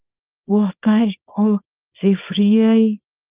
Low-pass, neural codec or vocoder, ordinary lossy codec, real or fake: 3.6 kHz; codec, 24 kHz, 0.9 kbps, WavTokenizer, small release; Opus, 24 kbps; fake